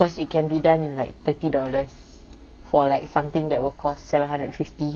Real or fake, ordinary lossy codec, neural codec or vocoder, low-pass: fake; none; codec, 44.1 kHz, 2.6 kbps, SNAC; 9.9 kHz